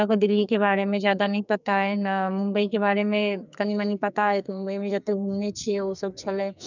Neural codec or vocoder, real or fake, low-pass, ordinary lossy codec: codec, 44.1 kHz, 2.6 kbps, SNAC; fake; 7.2 kHz; none